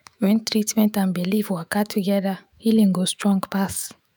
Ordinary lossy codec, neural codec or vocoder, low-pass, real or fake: none; autoencoder, 48 kHz, 128 numbers a frame, DAC-VAE, trained on Japanese speech; none; fake